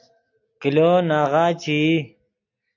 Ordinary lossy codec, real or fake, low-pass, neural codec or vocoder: AAC, 48 kbps; real; 7.2 kHz; none